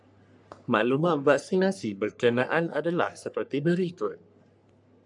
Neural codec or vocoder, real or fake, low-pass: codec, 44.1 kHz, 3.4 kbps, Pupu-Codec; fake; 10.8 kHz